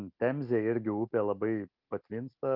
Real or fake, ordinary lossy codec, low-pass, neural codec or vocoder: real; Opus, 32 kbps; 5.4 kHz; none